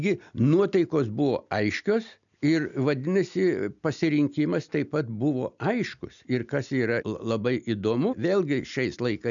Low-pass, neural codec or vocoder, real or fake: 7.2 kHz; none; real